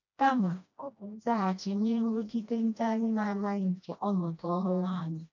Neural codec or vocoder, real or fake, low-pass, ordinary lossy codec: codec, 16 kHz, 1 kbps, FreqCodec, smaller model; fake; 7.2 kHz; none